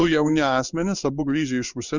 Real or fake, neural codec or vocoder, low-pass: fake; codec, 16 kHz in and 24 kHz out, 1 kbps, XY-Tokenizer; 7.2 kHz